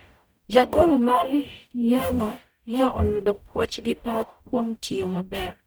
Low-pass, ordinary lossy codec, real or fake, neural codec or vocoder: none; none; fake; codec, 44.1 kHz, 0.9 kbps, DAC